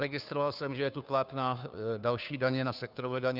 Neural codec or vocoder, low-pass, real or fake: codec, 16 kHz, 2 kbps, FunCodec, trained on Chinese and English, 25 frames a second; 5.4 kHz; fake